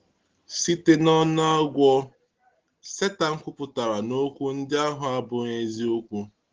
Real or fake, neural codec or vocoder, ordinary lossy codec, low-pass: real; none; Opus, 16 kbps; 7.2 kHz